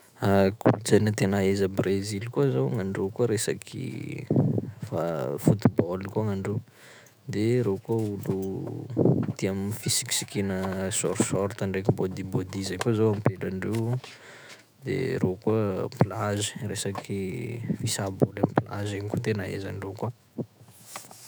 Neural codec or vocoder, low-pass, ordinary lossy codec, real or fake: autoencoder, 48 kHz, 128 numbers a frame, DAC-VAE, trained on Japanese speech; none; none; fake